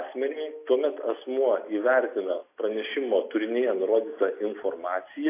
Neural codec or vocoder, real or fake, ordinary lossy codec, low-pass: none; real; AAC, 24 kbps; 3.6 kHz